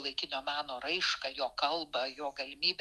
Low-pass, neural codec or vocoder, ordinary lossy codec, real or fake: 14.4 kHz; autoencoder, 48 kHz, 128 numbers a frame, DAC-VAE, trained on Japanese speech; Opus, 32 kbps; fake